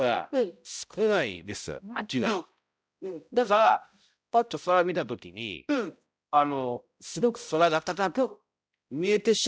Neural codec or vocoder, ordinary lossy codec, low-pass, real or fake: codec, 16 kHz, 0.5 kbps, X-Codec, HuBERT features, trained on balanced general audio; none; none; fake